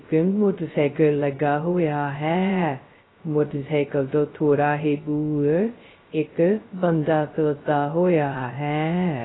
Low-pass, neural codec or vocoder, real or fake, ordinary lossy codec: 7.2 kHz; codec, 16 kHz, 0.2 kbps, FocalCodec; fake; AAC, 16 kbps